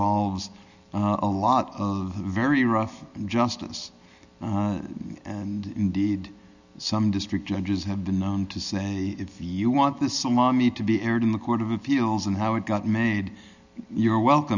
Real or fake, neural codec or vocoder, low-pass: real; none; 7.2 kHz